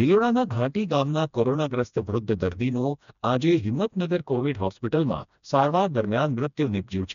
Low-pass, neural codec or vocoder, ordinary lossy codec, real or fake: 7.2 kHz; codec, 16 kHz, 1 kbps, FreqCodec, smaller model; none; fake